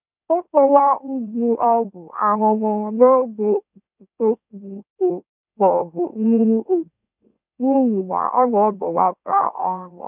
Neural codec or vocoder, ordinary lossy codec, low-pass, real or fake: autoencoder, 44.1 kHz, a latent of 192 numbers a frame, MeloTTS; none; 3.6 kHz; fake